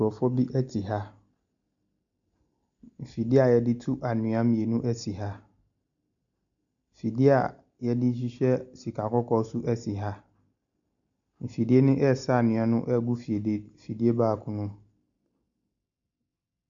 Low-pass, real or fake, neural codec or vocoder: 7.2 kHz; real; none